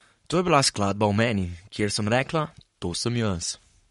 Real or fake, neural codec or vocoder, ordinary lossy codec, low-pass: fake; codec, 44.1 kHz, 7.8 kbps, Pupu-Codec; MP3, 48 kbps; 19.8 kHz